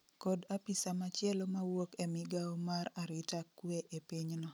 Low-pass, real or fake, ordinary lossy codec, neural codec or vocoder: none; real; none; none